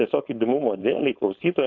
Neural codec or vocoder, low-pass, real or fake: codec, 16 kHz, 4.8 kbps, FACodec; 7.2 kHz; fake